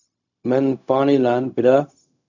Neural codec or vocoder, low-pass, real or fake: codec, 16 kHz, 0.4 kbps, LongCat-Audio-Codec; 7.2 kHz; fake